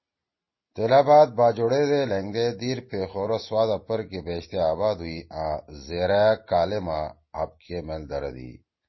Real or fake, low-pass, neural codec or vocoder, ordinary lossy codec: real; 7.2 kHz; none; MP3, 24 kbps